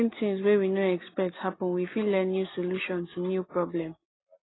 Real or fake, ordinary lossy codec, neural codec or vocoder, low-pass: real; AAC, 16 kbps; none; 7.2 kHz